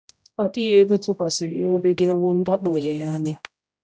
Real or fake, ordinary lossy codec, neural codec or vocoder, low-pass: fake; none; codec, 16 kHz, 0.5 kbps, X-Codec, HuBERT features, trained on general audio; none